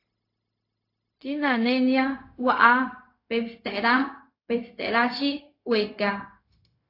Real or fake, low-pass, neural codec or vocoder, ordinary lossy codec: fake; 5.4 kHz; codec, 16 kHz, 0.4 kbps, LongCat-Audio-Codec; AAC, 32 kbps